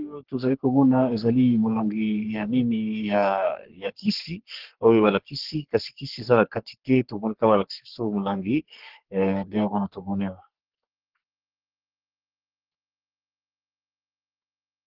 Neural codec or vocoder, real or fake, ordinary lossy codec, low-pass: codec, 44.1 kHz, 3.4 kbps, Pupu-Codec; fake; Opus, 16 kbps; 5.4 kHz